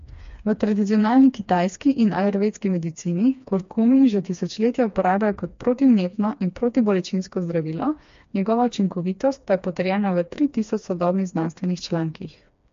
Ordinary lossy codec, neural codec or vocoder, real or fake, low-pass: MP3, 48 kbps; codec, 16 kHz, 2 kbps, FreqCodec, smaller model; fake; 7.2 kHz